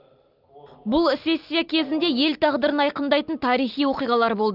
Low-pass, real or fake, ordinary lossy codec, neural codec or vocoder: 5.4 kHz; real; Opus, 24 kbps; none